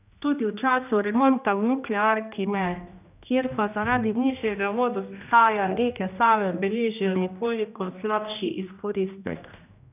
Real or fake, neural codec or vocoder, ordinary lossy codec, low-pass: fake; codec, 16 kHz, 1 kbps, X-Codec, HuBERT features, trained on general audio; none; 3.6 kHz